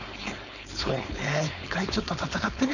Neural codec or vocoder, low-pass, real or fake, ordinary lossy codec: codec, 16 kHz, 4.8 kbps, FACodec; 7.2 kHz; fake; none